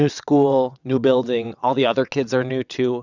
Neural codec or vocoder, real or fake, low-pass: vocoder, 22.05 kHz, 80 mel bands, WaveNeXt; fake; 7.2 kHz